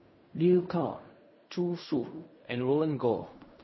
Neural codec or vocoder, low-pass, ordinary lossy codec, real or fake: codec, 16 kHz in and 24 kHz out, 0.4 kbps, LongCat-Audio-Codec, fine tuned four codebook decoder; 7.2 kHz; MP3, 24 kbps; fake